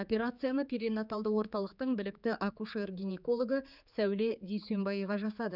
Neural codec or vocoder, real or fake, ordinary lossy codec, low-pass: codec, 16 kHz, 4 kbps, X-Codec, HuBERT features, trained on general audio; fake; none; 5.4 kHz